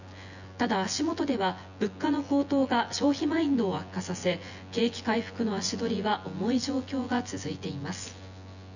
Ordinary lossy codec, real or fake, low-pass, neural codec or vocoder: none; fake; 7.2 kHz; vocoder, 24 kHz, 100 mel bands, Vocos